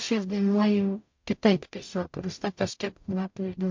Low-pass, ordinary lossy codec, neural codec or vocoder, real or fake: 7.2 kHz; MP3, 48 kbps; codec, 44.1 kHz, 0.9 kbps, DAC; fake